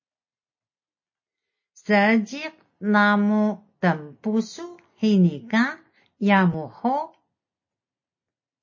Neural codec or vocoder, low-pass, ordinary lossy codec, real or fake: none; 7.2 kHz; MP3, 32 kbps; real